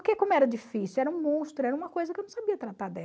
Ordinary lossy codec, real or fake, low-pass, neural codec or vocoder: none; real; none; none